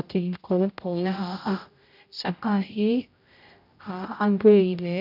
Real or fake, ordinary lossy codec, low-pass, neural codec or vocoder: fake; none; 5.4 kHz; codec, 16 kHz, 0.5 kbps, X-Codec, HuBERT features, trained on general audio